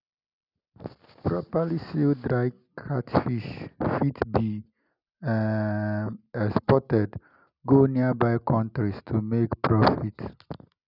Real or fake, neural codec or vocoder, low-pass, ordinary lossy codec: real; none; 5.4 kHz; none